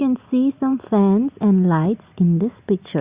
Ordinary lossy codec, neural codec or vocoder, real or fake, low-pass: Opus, 64 kbps; none; real; 3.6 kHz